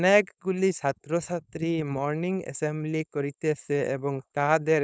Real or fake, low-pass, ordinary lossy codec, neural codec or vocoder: fake; none; none; codec, 16 kHz, 4.8 kbps, FACodec